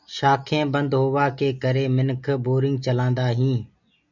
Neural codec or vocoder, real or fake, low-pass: none; real; 7.2 kHz